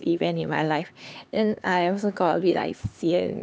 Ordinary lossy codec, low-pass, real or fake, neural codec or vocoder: none; none; fake; codec, 16 kHz, 4 kbps, X-Codec, HuBERT features, trained on LibriSpeech